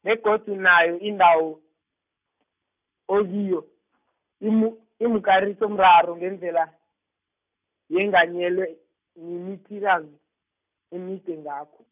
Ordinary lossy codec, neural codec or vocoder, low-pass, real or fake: none; none; 3.6 kHz; real